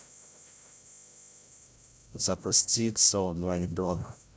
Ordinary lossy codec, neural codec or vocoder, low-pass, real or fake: none; codec, 16 kHz, 0.5 kbps, FreqCodec, larger model; none; fake